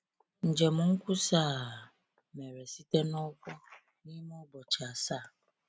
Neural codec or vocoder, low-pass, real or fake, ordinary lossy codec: none; none; real; none